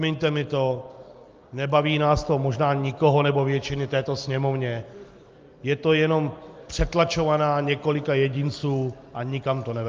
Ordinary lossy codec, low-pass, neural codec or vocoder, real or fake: Opus, 24 kbps; 7.2 kHz; none; real